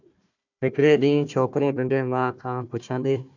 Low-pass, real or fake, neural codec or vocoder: 7.2 kHz; fake; codec, 16 kHz, 1 kbps, FunCodec, trained on Chinese and English, 50 frames a second